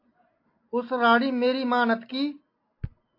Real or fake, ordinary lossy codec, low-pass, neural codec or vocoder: real; AAC, 32 kbps; 5.4 kHz; none